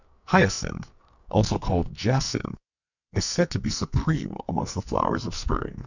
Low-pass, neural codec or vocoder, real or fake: 7.2 kHz; codec, 44.1 kHz, 2.6 kbps, SNAC; fake